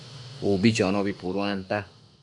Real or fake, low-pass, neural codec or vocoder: fake; 10.8 kHz; autoencoder, 48 kHz, 32 numbers a frame, DAC-VAE, trained on Japanese speech